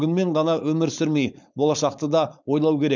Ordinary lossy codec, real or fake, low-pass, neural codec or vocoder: none; fake; 7.2 kHz; codec, 16 kHz, 4.8 kbps, FACodec